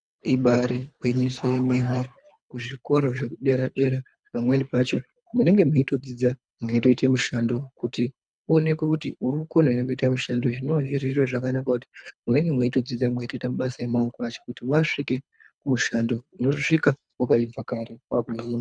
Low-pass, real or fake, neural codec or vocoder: 9.9 kHz; fake; codec, 24 kHz, 3 kbps, HILCodec